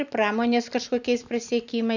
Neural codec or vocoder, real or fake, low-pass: none; real; 7.2 kHz